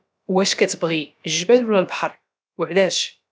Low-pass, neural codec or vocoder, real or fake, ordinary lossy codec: none; codec, 16 kHz, about 1 kbps, DyCAST, with the encoder's durations; fake; none